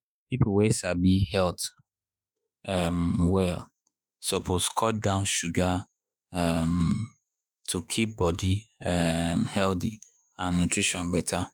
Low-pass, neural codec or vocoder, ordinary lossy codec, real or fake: none; autoencoder, 48 kHz, 32 numbers a frame, DAC-VAE, trained on Japanese speech; none; fake